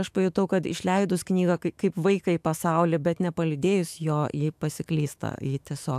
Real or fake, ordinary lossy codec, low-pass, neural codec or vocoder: fake; AAC, 96 kbps; 14.4 kHz; autoencoder, 48 kHz, 128 numbers a frame, DAC-VAE, trained on Japanese speech